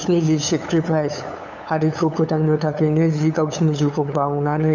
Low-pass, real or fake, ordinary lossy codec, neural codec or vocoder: 7.2 kHz; fake; none; codec, 16 kHz, 8 kbps, FunCodec, trained on LibriTTS, 25 frames a second